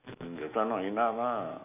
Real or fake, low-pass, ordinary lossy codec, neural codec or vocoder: fake; 3.6 kHz; none; autoencoder, 48 kHz, 128 numbers a frame, DAC-VAE, trained on Japanese speech